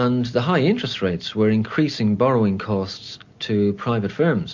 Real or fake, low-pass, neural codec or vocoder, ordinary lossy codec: real; 7.2 kHz; none; MP3, 48 kbps